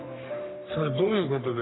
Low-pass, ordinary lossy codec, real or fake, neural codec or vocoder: 7.2 kHz; AAC, 16 kbps; fake; codec, 44.1 kHz, 3.4 kbps, Pupu-Codec